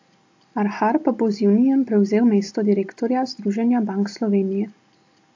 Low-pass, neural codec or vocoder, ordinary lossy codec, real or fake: 7.2 kHz; none; AAC, 48 kbps; real